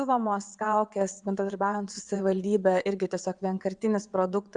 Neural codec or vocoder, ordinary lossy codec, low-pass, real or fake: vocoder, 22.05 kHz, 80 mel bands, WaveNeXt; Opus, 64 kbps; 9.9 kHz; fake